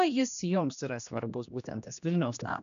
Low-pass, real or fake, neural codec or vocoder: 7.2 kHz; fake; codec, 16 kHz, 1 kbps, X-Codec, HuBERT features, trained on general audio